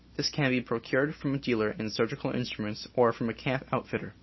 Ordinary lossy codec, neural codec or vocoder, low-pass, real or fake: MP3, 24 kbps; none; 7.2 kHz; real